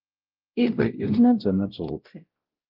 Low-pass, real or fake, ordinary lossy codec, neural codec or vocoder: 5.4 kHz; fake; Opus, 24 kbps; codec, 16 kHz, 0.5 kbps, X-Codec, WavLM features, trained on Multilingual LibriSpeech